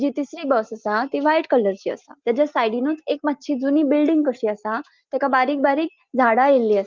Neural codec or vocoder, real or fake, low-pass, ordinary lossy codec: none; real; 7.2 kHz; Opus, 32 kbps